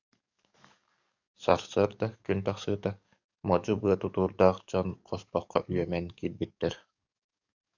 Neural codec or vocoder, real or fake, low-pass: codec, 44.1 kHz, 7.8 kbps, DAC; fake; 7.2 kHz